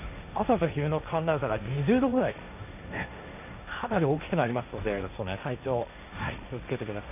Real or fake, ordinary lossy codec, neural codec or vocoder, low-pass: fake; none; codec, 16 kHz, 1.1 kbps, Voila-Tokenizer; 3.6 kHz